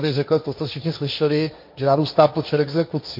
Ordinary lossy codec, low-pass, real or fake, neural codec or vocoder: MP3, 32 kbps; 5.4 kHz; fake; codec, 16 kHz, 1.1 kbps, Voila-Tokenizer